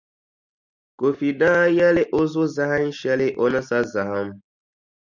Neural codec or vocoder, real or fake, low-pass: none; real; 7.2 kHz